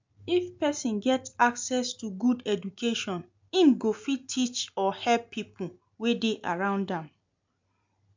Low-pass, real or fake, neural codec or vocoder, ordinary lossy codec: 7.2 kHz; real; none; MP3, 64 kbps